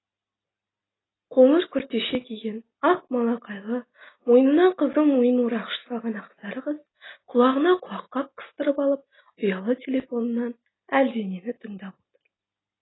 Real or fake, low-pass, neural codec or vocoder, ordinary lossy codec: real; 7.2 kHz; none; AAC, 16 kbps